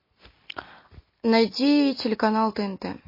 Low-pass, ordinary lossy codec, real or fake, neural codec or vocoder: 5.4 kHz; MP3, 24 kbps; real; none